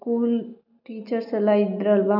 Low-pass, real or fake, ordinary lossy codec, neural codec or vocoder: 5.4 kHz; real; none; none